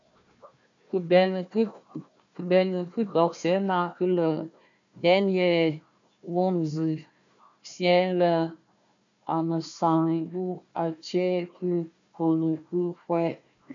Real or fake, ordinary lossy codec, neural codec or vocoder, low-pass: fake; MP3, 64 kbps; codec, 16 kHz, 1 kbps, FunCodec, trained on Chinese and English, 50 frames a second; 7.2 kHz